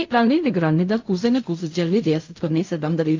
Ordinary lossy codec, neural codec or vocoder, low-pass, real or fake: AAC, 48 kbps; codec, 16 kHz in and 24 kHz out, 0.4 kbps, LongCat-Audio-Codec, fine tuned four codebook decoder; 7.2 kHz; fake